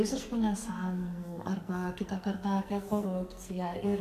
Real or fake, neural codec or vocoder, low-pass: fake; codec, 44.1 kHz, 2.6 kbps, SNAC; 14.4 kHz